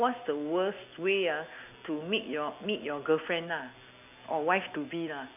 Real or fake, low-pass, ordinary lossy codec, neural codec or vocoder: real; 3.6 kHz; none; none